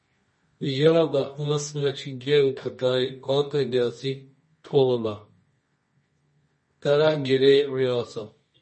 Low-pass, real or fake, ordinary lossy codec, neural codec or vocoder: 10.8 kHz; fake; MP3, 32 kbps; codec, 24 kHz, 0.9 kbps, WavTokenizer, medium music audio release